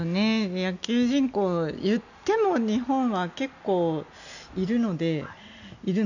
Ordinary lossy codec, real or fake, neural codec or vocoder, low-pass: none; real; none; 7.2 kHz